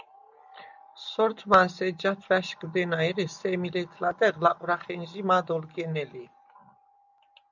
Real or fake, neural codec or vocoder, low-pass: real; none; 7.2 kHz